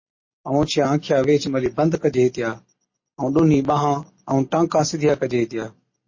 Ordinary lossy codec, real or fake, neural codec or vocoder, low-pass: MP3, 32 kbps; real; none; 7.2 kHz